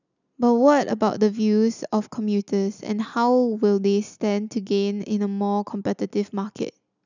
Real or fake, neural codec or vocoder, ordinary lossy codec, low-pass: real; none; none; 7.2 kHz